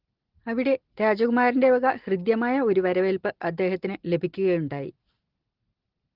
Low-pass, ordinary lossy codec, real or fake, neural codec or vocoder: 5.4 kHz; Opus, 16 kbps; real; none